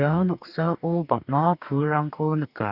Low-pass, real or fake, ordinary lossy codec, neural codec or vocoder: 5.4 kHz; fake; none; codec, 44.1 kHz, 2.6 kbps, DAC